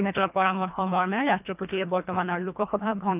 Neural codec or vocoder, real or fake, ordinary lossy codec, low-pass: codec, 24 kHz, 1.5 kbps, HILCodec; fake; none; 3.6 kHz